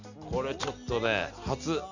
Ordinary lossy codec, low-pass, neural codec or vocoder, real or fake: none; 7.2 kHz; none; real